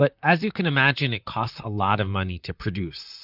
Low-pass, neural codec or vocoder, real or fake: 5.4 kHz; vocoder, 44.1 kHz, 128 mel bands, Pupu-Vocoder; fake